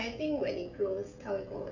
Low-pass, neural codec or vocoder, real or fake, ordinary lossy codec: 7.2 kHz; codec, 16 kHz, 16 kbps, FreqCodec, smaller model; fake; none